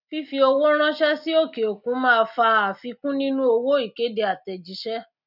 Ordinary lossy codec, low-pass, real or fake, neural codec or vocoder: none; 5.4 kHz; real; none